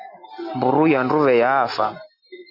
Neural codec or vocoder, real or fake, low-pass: none; real; 5.4 kHz